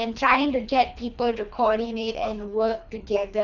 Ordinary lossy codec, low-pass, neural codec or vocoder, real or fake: none; 7.2 kHz; codec, 24 kHz, 3 kbps, HILCodec; fake